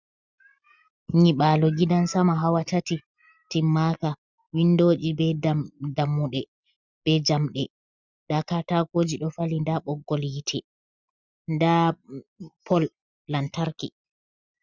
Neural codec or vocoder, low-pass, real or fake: none; 7.2 kHz; real